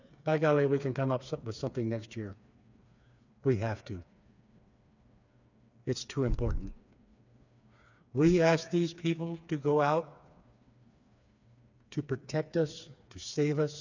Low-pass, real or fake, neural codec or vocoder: 7.2 kHz; fake; codec, 16 kHz, 4 kbps, FreqCodec, smaller model